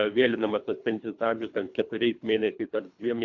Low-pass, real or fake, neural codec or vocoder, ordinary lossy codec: 7.2 kHz; fake; codec, 24 kHz, 3 kbps, HILCodec; MP3, 64 kbps